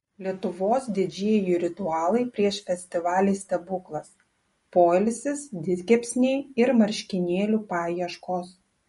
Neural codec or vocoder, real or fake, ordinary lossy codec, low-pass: none; real; MP3, 48 kbps; 19.8 kHz